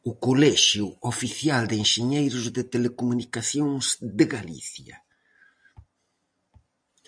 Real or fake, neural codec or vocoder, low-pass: real; none; 9.9 kHz